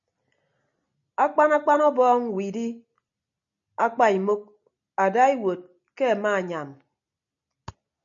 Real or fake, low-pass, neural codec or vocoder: real; 7.2 kHz; none